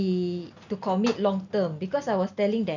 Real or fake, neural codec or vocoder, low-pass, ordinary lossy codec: real; none; 7.2 kHz; none